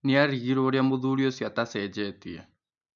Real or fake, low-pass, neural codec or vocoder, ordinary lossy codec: real; 7.2 kHz; none; none